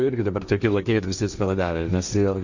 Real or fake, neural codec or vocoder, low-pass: fake; codec, 16 kHz, 1.1 kbps, Voila-Tokenizer; 7.2 kHz